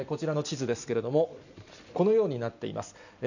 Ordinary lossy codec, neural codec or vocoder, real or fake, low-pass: none; none; real; 7.2 kHz